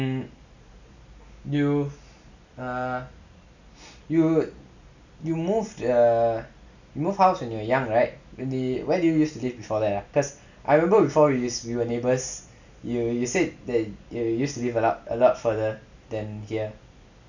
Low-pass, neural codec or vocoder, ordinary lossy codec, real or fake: 7.2 kHz; none; none; real